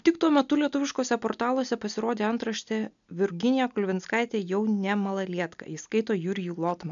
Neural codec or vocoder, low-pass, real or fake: none; 7.2 kHz; real